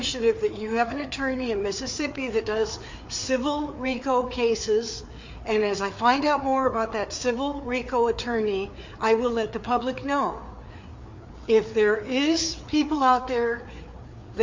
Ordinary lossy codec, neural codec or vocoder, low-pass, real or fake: MP3, 48 kbps; codec, 16 kHz, 4 kbps, FreqCodec, larger model; 7.2 kHz; fake